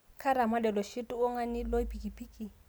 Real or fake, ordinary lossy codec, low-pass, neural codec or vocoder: real; none; none; none